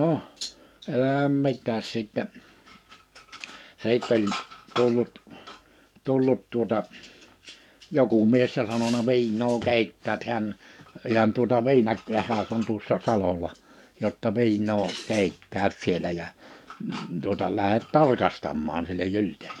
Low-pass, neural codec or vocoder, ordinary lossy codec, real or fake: 19.8 kHz; codec, 44.1 kHz, 7.8 kbps, DAC; none; fake